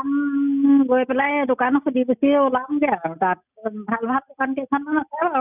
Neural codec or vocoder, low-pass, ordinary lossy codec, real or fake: none; 3.6 kHz; none; real